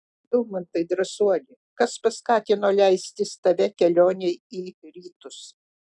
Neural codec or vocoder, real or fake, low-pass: none; real; 10.8 kHz